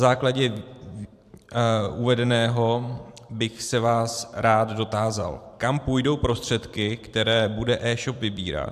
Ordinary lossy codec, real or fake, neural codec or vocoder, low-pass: AAC, 96 kbps; fake; vocoder, 44.1 kHz, 128 mel bands every 512 samples, BigVGAN v2; 14.4 kHz